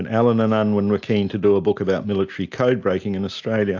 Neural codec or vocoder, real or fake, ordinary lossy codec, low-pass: none; real; AAC, 48 kbps; 7.2 kHz